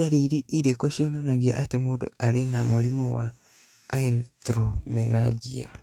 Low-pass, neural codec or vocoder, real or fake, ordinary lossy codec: 19.8 kHz; codec, 44.1 kHz, 2.6 kbps, DAC; fake; none